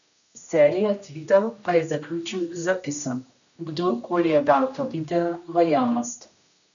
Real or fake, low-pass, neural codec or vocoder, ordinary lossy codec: fake; 7.2 kHz; codec, 16 kHz, 1 kbps, X-Codec, HuBERT features, trained on general audio; none